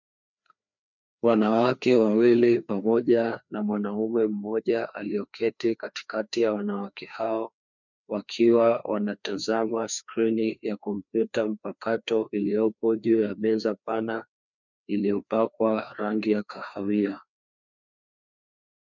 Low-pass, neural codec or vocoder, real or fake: 7.2 kHz; codec, 16 kHz, 2 kbps, FreqCodec, larger model; fake